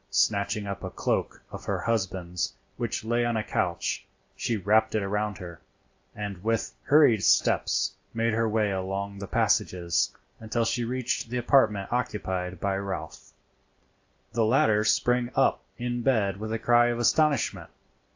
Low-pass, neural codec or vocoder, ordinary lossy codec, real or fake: 7.2 kHz; none; AAC, 48 kbps; real